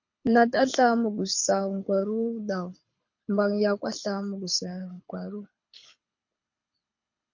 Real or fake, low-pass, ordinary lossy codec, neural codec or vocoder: fake; 7.2 kHz; MP3, 48 kbps; codec, 24 kHz, 6 kbps, HILCodec